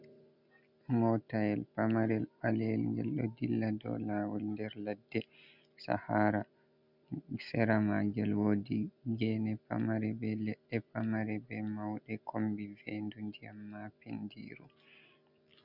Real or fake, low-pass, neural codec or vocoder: real; 5.4 kHz; none